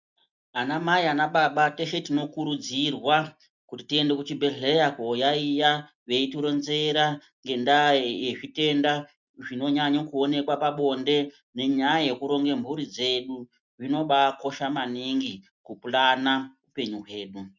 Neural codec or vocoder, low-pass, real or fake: none; 7.2 kHz; real